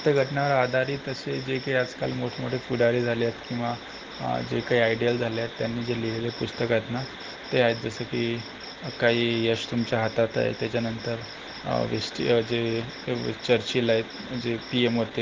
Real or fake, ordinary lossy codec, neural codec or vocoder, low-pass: real; Opus, 16 kbps; none; 7.2 kHz